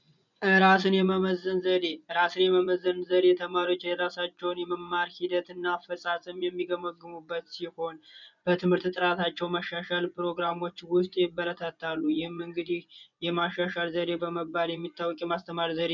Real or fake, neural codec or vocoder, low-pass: fake; vocoder, 24 kHz, 100 mel bands, Vocos; 7.2 kHz